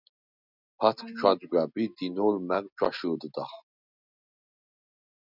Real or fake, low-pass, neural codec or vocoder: real; 5.4 kHz; none